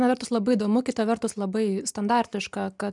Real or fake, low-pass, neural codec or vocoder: real; 10.8 kHz; none